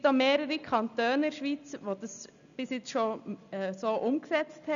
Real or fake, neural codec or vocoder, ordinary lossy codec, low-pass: real; none; none; 7.2 kHz